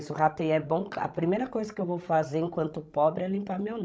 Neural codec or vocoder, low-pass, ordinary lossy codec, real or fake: codec, 16 kHz, 16 kbps, FreqCodec, larger model; none; none; fake